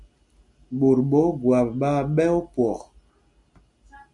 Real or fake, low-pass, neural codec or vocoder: real; 10.8 kHz; none